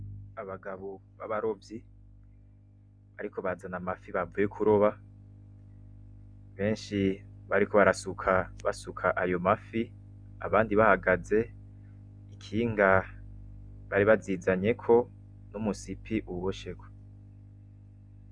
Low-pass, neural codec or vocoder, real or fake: 9.9 kHz; none; real